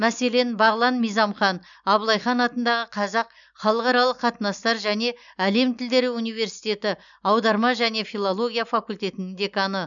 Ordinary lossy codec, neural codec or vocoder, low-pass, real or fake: none; none; 7.2 kHz; real